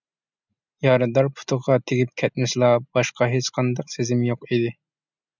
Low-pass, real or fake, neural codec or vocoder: 7.2 kHz; real; none